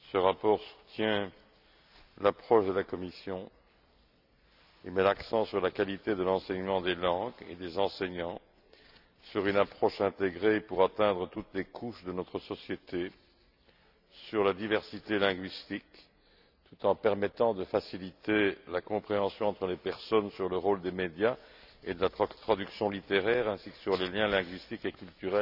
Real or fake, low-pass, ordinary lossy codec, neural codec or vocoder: real; 5.4 kHz; AAC, 48 kbps; none